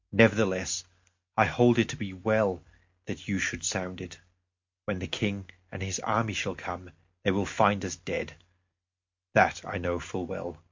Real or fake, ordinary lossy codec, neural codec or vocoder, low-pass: real; MP3, 48 kbps; none; 7.2 kHz